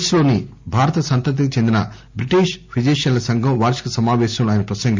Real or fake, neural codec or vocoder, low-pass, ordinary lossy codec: real; none; 7.2 kHz; MP3, 64 kbps